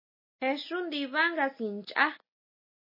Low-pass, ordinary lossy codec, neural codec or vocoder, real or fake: 5.4 kHz; MP3, 24 kbps; none; real